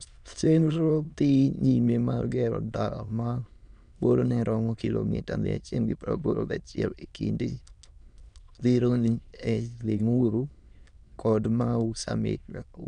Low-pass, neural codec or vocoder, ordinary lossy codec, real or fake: 9.9 kHz; autoencoder, 22.05 kHz, a latent of 192 numbers a frame, VITS, trained on many speakers; none; fake